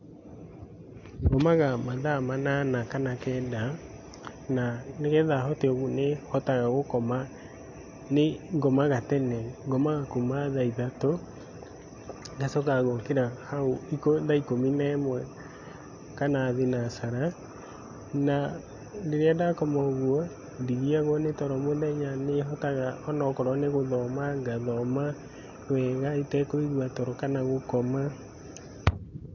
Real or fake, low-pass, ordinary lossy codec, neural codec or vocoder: real; 7.2 kHz; AAC, 48 kbps; none